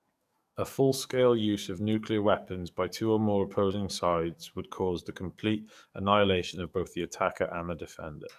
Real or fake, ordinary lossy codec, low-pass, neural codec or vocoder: fake; none; 14.4 kHz; codec, 44.1 kHz, 7.8 kbps, DAC